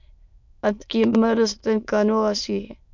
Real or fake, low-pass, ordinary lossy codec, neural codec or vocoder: fake; 7.2 kHz; MP3, 64 kbps; autoencoder, 22.05 kHz, a latent of 192 numbers a frame, VITS, trained on many speakers